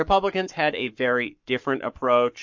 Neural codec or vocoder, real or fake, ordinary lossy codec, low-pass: codec, 44.1 kHz, 7.8 kbps, Pupu-Codec; fake; MP3, 48 kbps; 7.2 kHz